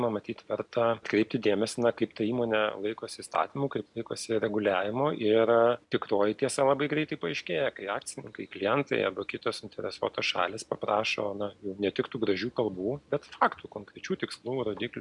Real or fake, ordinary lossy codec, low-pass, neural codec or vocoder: real; MP3, 96 kbps; 10.8 kHz; none